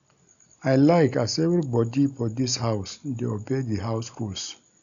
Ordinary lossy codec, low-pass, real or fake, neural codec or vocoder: none; 7.2 kHz; real; none